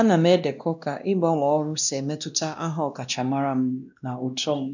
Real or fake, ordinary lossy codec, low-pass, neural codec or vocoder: fake; none; 7.2 kHz; codec, 16 kHz, 1 kbps, X-Codec, WavLM features, trained on Multilingual LibriSpeech